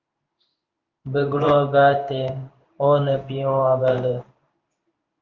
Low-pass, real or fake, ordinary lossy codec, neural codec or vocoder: 7.2 kHz; fake; Opus, 24 kbps; codec, 16 kHz in and 24 kHz out, 1 kbps, XY-Tokenizer